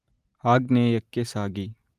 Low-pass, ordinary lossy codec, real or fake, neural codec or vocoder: 14.4 kHz; Opus, 32 kbps; real; none